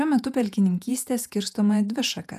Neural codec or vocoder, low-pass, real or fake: vocoder, 48 kHz, 128 mel bands, Vocos; 14.4 kHz; fake